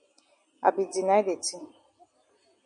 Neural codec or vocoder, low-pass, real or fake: none; 9.9 kHz; real